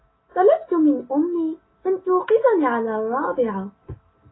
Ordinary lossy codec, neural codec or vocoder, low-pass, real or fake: AAC, 16 kbps; none; 7.2 kHz; real